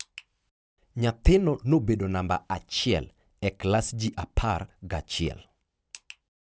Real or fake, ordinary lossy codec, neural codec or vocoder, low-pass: real; none; none; none